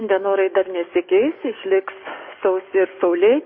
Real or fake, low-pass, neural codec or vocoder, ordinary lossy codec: fake; 7.2 kHz; codec, 44.1 kHz, 7.8 kbps, DAC; MP3, 24 kbps